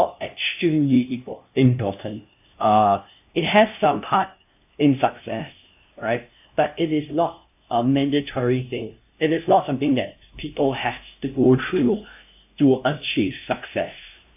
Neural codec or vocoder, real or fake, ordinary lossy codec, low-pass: codec, 16 kHz, 0.5 kbps, FunCodec, trained on LibriTTS, 25 frames a second; fake; AAC, 32 kbps; 3.6 kHz